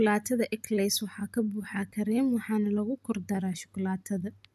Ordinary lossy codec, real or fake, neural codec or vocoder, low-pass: none; real; none; 14.4 kHz